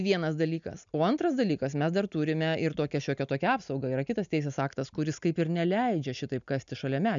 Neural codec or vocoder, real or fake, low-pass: none; real; 7.2 kHz